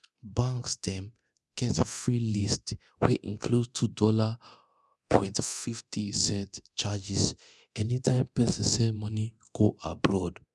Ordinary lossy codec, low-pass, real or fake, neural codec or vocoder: none; none; fake; codec, 24 kHz, 0.9 kbps, DualCodec